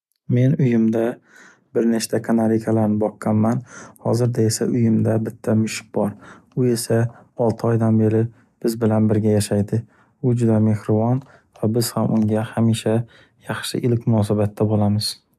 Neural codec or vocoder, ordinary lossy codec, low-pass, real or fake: none; none; 14.4 kHz; real